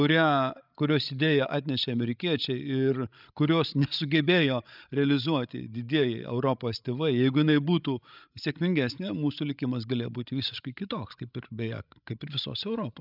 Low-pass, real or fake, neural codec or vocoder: 5.4 kHz; fake; codec, 16 kHz, 16 kbps, FreqCodec, larger model